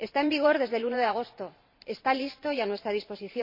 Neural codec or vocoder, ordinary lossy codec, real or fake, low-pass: vocoder, 44.1 kHz, 128 mel bands every 512 samples, BigVGAN v2; MP3, 32 kbps; fake; 5.4 kHz